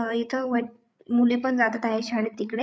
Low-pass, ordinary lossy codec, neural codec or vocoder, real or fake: none; none; codec, 16 kHz, 8 kbps, FreqCodec, larger model; fake